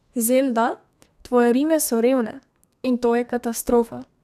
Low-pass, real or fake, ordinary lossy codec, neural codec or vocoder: 14.4 kHz; fake; none; codec, 32 kHz, 1.9 kbps, SNAC